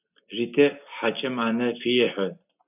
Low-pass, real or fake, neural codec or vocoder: 3.6 kHz; real; none